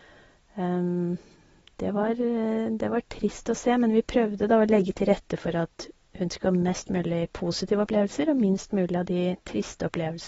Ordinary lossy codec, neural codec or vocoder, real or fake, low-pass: AAC, 24 kbps; none; real; 19.8 kHz